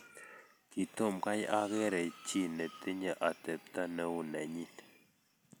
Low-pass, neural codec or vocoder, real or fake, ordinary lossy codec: none; none; real; none